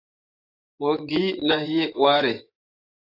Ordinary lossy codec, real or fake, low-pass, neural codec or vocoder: AAC, 32 kbps; fake; 5.4 kHz; vocoder, 24 kHz, 100 mel bands, Vocos